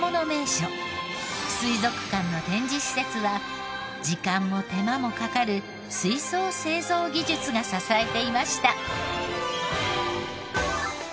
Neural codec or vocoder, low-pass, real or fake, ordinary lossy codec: none; none; real; none